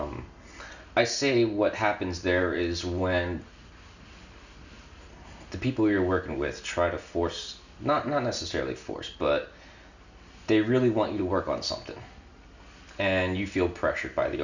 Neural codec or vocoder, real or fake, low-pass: none; real; 7.2 kHz